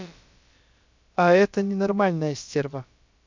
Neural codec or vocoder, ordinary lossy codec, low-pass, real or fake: codec, 16 kHz, about 1 kbps, DyCAST, with the encoder's durations; MP3, 64 kbps; 7.2 kHz; fake